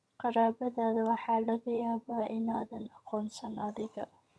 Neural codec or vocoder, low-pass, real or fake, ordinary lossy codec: vocoder, 22.05 kHz, 80 mel bands, HiFi-GAN; none; fake; none